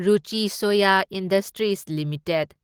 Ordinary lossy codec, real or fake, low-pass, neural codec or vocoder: Opus, 16 kbps; real; 19.8 kHz; none